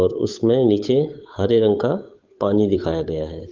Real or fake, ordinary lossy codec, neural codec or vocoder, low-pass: fake; Opus, 32 kbps; codec, 16 kHz, 8 kbps, FunCodec, trained on Chinese and English, 25 frames a second; 7.2 kHz